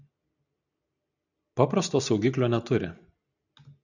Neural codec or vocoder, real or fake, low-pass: none; real; 7.2 kHz